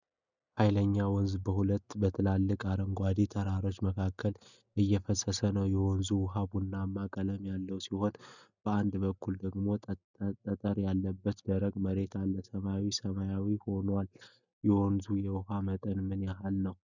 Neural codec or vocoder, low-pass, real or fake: none; 7.2 kHz; real